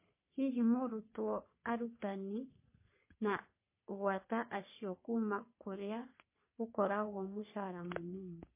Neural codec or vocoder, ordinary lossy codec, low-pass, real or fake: codec, 44.1 kHz, 3.4 kbps, Pupu-Codec; MP3, 24 kbps; 3.6 kHz; fake